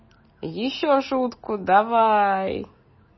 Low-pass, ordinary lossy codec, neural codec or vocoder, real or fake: 7.2 kHz; MP3, 24 kbps; none; real